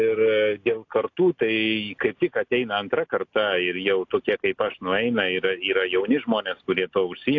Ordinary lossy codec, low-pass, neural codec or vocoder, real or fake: AAC, 48 kbps; 7.2 kHz; none; real